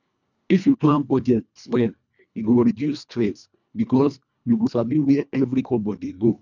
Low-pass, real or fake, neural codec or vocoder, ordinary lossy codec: 7.2 kHz; fake; codec, 24 kHz, 1.5 kbps, HILCodec; none